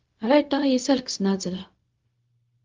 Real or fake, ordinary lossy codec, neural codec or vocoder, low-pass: fake; Opus, 32 kbps; codec, 16 kHz, 0.4 kbps, LongCat-Audio-Codec; 7.2 kHz